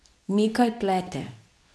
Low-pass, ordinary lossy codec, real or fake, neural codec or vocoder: none; none; fake; codec, 24 kHz, 0.9 kbps, WavTokenizer, medium speech release version 2